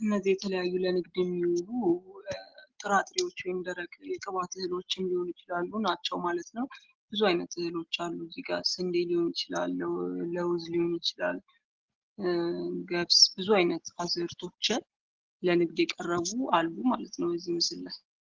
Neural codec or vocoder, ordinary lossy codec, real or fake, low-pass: none; Opus, 16 kbps; real; 7.2 kHz